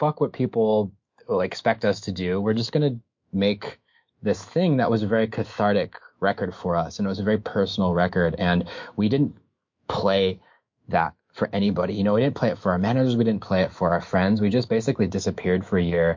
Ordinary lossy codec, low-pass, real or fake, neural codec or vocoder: MP3, 48 kbps; 7.2 kHz; fake; autoencoder, 48 kHz, 128 numbers a frame, DAC-VAE, trained on Japanese speech